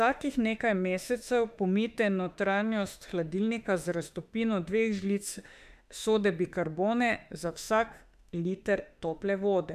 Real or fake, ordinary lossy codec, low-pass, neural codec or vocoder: fake; none; 14.4 kHz; autoencoder, 48 kHz, 32 numbers a frame, DAC-VAE, trained on Japanese speech